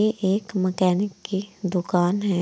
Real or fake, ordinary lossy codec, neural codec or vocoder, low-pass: real; none; none; none